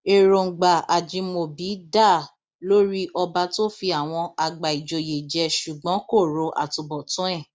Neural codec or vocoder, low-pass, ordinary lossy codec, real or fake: none; none; none; real